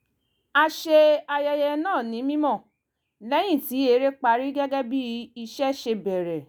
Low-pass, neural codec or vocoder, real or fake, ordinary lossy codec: none; none; real; none